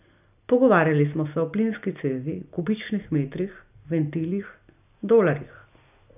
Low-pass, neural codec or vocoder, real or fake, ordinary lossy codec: 3.6 kHz; none; real; none